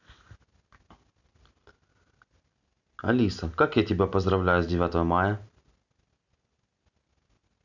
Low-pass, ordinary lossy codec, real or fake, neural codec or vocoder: 7.2 kHz; none; real; none